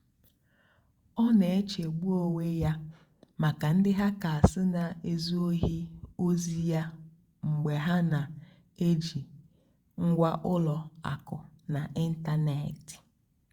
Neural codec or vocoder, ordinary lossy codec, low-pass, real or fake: vocoder, 48 kHz, 128 mel bands, Vocos; Opus, 64 kbps; 19.8 kHz; fake